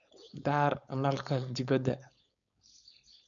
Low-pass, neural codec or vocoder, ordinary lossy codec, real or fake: 7.2 kHz; codec, 16 kHz, 4.8 kbps, FACodec; none; fake